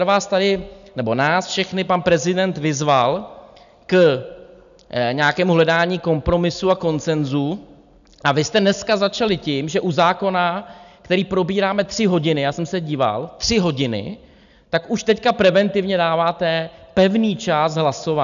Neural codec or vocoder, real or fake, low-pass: none; real; 7.2 kHz